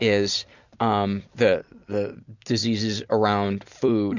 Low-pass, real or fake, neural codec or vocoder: 7.2 kHz; fake; vocoder, 44.1 kHz, 128 mel bands every 256 samples, BigVGAN v2